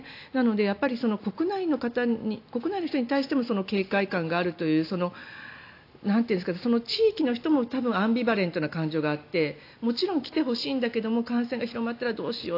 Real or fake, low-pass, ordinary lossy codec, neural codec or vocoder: real; 5.4 kHz; AAC, 32 kbps; none